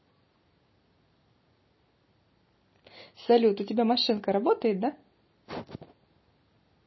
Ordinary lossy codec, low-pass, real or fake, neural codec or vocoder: MP3, 24 kbps; 7.2 kHz; real; none